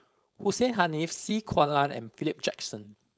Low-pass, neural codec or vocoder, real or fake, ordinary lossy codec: none; codec, 16 kHz, 4.8 kbps, FACodec; fake; none